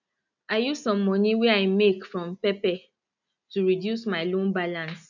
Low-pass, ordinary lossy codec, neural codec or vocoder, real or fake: 7.2 kHz; none; none; real